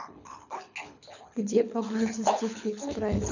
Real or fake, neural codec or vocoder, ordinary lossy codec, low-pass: fake; codec, 24 kHz, 6 kbps, HILCodec; none; 7.2 kHz